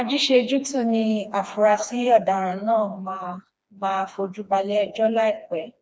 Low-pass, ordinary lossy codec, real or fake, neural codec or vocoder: none; none; fake; codec, 16 kHz, 2 kbps, FreqCodec, smaller model